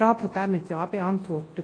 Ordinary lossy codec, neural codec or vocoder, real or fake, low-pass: AAC, 32 kbps; codec, 24 kHz, 0.9 kbps, WavTokenizer, large speech release; fake; 9.9 kHz